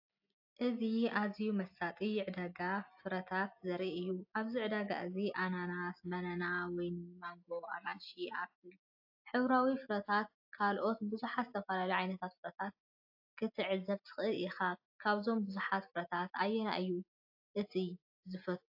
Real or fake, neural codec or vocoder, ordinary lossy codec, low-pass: real; none; AAC, 48 kbps; 5.4 kHz